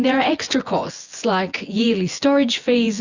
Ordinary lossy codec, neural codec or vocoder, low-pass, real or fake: Opus, 64 kbps; vocoder, 24 kHz, 100 mel bands, Vocos; 7.2 kHz; fake